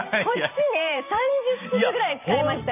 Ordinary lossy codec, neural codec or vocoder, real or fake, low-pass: none; vocoder, 44.1 kHz, 128 mel bands every 512 samples, BigVGAN v2; fake; 3.6 kHz